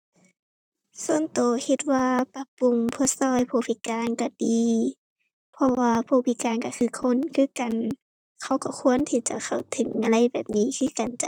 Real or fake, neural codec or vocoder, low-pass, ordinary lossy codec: fake; vocoder, 44.1 kHz, 128 mel bands, Pupu-Vocoder; 19.8 kHz; none